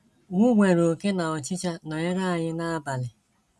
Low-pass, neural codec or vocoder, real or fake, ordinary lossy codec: none; none; real; none